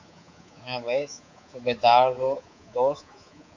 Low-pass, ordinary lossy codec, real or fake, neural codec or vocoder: 7.2 kHz; AAC, 48 kbps; fake; codec, 24 kHz, 3.1 kbps, DualCodec